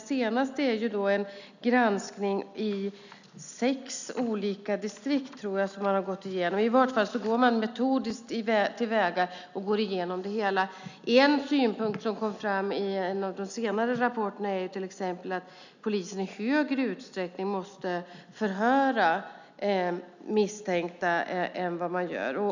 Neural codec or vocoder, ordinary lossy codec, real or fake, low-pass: none; none; real; 7.2 kHz